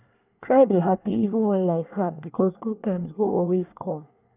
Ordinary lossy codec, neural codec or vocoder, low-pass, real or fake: none; codec, 24 kHz, 1 kbps, SNAC; 3.6 kHz; fake